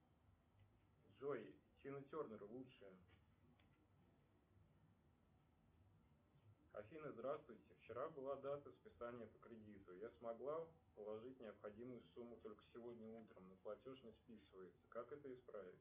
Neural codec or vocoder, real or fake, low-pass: none; real; 3.6 kHz